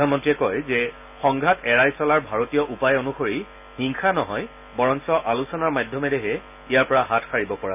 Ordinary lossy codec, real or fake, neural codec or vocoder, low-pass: none; real; none; 3.6 kHz